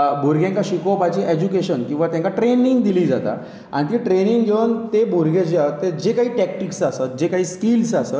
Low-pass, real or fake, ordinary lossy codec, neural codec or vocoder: none; real; none; none